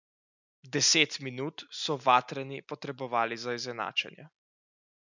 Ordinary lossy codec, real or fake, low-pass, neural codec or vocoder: none; real; 7.2 kHz; none